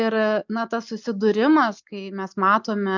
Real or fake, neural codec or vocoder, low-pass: real; none; 7.2 kHz